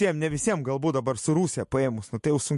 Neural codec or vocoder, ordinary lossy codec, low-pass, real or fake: none; MP3, 48 kbps; 14.4 kHz; real